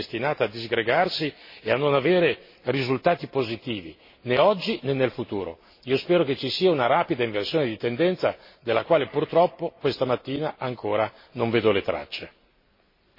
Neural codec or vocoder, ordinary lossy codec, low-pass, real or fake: none; MP3, 24 kbps; 5.4 kHz; real